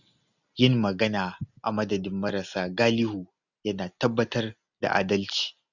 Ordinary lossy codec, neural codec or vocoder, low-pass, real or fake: none; none; 7.2 kHz; real